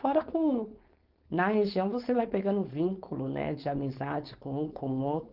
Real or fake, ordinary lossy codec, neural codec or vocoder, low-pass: fake; Opus, 32 kbps; codec, 16 kHz, 4.8 kbps, FACodec; 5.4 kHz